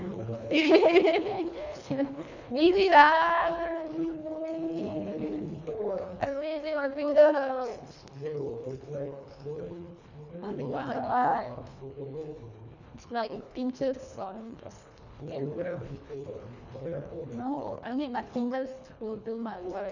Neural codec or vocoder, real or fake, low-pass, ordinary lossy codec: codec, 24 kHz, 1.5 kbps, HILCodec; fake; 7.2 kHz; none